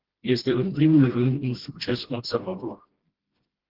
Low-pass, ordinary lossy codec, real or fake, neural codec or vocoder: 5.4 kHz; Opus, 16 kbps; fake; codec, 16 kHz, 1 kbps, FreqCodec, smaller model